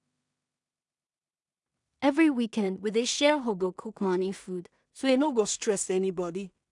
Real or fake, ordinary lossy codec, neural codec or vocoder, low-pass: fake; none; codec, 16 kHz in and 24 kHz out, 0.4 kbps, LongCat-Audio-Codec, two codebook decoder; 10.8 kHz